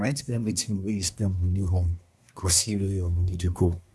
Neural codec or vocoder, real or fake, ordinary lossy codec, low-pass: codec, 24 kHz, 1 kbps, SNAC; fake; none; none